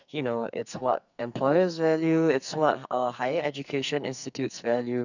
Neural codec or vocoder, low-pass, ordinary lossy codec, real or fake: codec, 44.1 kHz, 2.6 kbps, SNAC; 7.2 kHz; none; fake